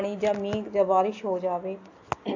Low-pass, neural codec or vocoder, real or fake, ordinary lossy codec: 7.2 kHz; none; real; AAC, 48 kbps